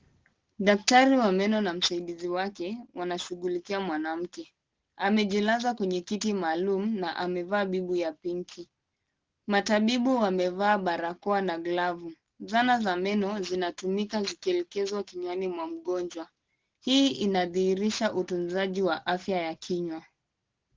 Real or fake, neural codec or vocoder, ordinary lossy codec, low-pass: real; none; Opus, 16 kbps; 7.2 kHz